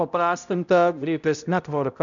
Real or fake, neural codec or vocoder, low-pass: fake; codec, 16 kHz, 0.5 kbps, X-Codec, HuBERT features, trained on balanced general audio; 7.2 kHz